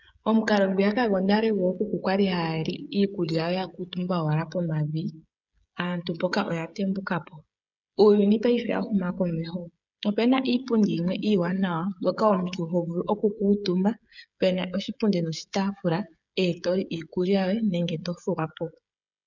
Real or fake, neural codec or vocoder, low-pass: fake; codec, 16 kHz, 16 kbps, FreqCodec, smaller model; 7.2 kHz